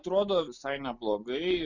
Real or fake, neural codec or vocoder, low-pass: real; none; 7.2 kHz